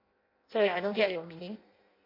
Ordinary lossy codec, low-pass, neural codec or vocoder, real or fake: MP3, 32 kbps; 5.4 kHz; codec, 16 kHz in and 24 kHz out, 0.6 kbps, FireRedTTS-2 codec; fake